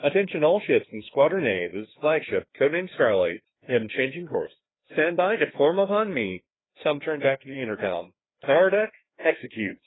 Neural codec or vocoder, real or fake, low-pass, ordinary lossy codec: codec, 16 kHz, 2 kbps, FreqCodec, larger model; fake; 7.2 kHz; AAC, 16 kbps